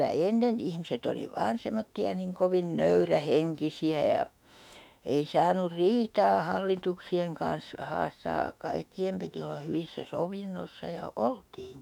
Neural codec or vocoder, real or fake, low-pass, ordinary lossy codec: autoencoder, 48 kHz, 32 numbers a frame, DAC-VAE, trained on Japanese speech; fake; 19.8 kHz; none